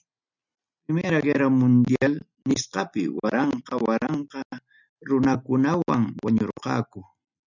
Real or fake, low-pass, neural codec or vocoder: real; 7.2 kHz; none